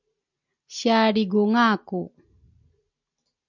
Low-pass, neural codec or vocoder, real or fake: 7.2 kHz; none; real